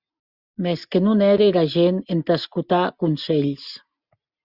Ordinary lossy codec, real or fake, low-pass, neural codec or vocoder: Opus, 64 kbps; real; 5.4 kHz; none